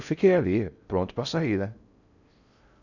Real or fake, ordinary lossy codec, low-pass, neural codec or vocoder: fake; none; 7.2 kHz; codec, 16 kHz in and 24 kHz out, 0.6 kbps, FocalCodec, streaming, 4096 codes